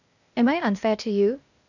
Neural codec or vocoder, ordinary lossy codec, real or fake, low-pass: codec, 16 kHz, 0.8 kbps, ZipCodec; none; fake; 7.2 kHz